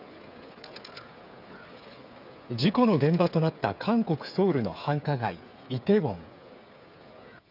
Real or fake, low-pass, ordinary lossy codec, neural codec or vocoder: fake; 5.4 kHz; none; codec, 16 kHz, 8 kbps, FreqCodec, smaller model